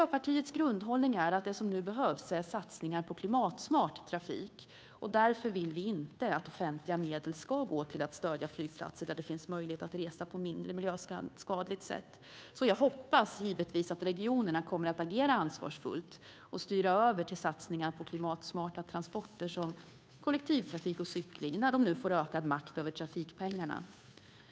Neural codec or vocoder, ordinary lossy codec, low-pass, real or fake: codec, 16 kHz, 2 kbps, FunCodec, trained on Chinese and English, 25 frames a second; none; none; fake